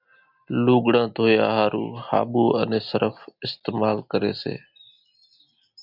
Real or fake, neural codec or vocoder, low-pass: real; none; 5.4 kHz